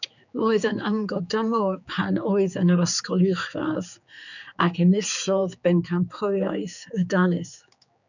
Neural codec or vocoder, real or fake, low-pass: codec, 16 kHz, 4 kbps, X-Codec, HuBERT features, trained on general audio; fake; 7.2 kHz